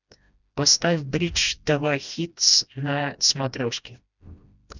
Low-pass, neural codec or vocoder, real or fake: 7.2 kHz; codec, 16 kHz, 1 kbps, FreqCodec, smaller model; fake